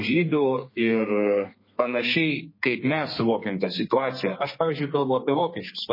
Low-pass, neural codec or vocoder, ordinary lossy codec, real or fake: 5.4 kHz; codec, 32 kHz, 1.9 kbps, SNAC; MP3, 24 kbps; fake